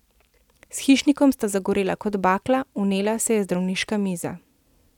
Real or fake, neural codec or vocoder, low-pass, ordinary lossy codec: real; none; 19.8 kHz; none